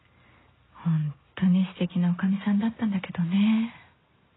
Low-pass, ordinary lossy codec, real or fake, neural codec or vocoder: 7.2 kHz; AAC, 16 kbps; real; none